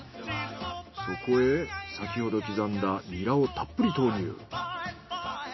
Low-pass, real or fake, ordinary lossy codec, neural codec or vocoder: 7.2 kHz; real; MP3, 24 kbps; none